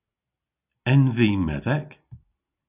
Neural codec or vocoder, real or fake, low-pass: none; real; 3.6 kHz